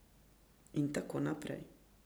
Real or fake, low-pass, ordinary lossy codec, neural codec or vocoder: real; none; none; none